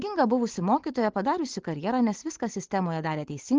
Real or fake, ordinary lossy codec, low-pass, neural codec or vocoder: real; Opus, 16 kbps; 7.2 kHz; none